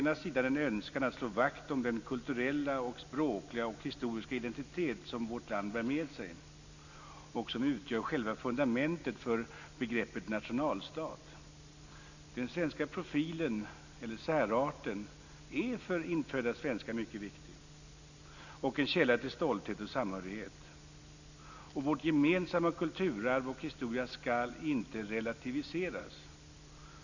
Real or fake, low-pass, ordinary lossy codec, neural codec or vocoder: real; 7.2 kHz; none; none